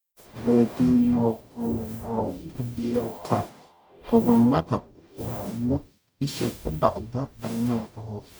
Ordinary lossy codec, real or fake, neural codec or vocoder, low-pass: none; fake; codec, 44.1 kHz, 0.9 kbps, DAC; none